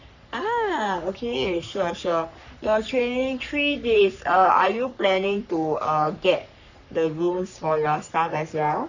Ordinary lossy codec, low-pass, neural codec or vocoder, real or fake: none; 7.2 kHz; codec, 44.1 kHz, 3.4 kbps, Pupu-Codec; fake